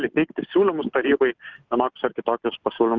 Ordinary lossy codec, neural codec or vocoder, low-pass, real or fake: Opus, 24 kbps; none; 7.2 kHz; real